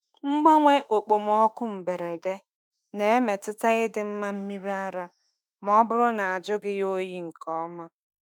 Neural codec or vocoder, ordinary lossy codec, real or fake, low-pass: autoencoder, 48 kHz, 32 numbers a frame, DAC-VAE, trained on Japanese speech; none; fake; 19.8 kHz